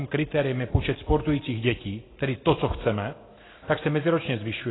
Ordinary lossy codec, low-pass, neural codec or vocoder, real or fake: AAC, 16 kbps; 7.2 kHz; none; real